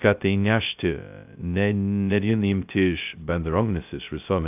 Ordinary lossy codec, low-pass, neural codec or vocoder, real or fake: AAC, 32 kbps; 3.6 kHz; codec, 16 kHz, 0.2 kbps, FocalCodec; fake